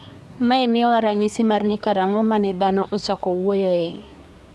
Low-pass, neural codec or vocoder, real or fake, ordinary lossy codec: none; codec, 24 kHz, 1 kbps, SNAC; fake; none